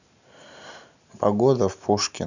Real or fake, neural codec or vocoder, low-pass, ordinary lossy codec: real; none; 7.2 kHz; none